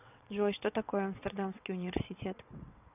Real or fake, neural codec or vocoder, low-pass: fake; vocoder, 22.05 kHz, 80 mel bands, WaveNeXt; 3.6 kHz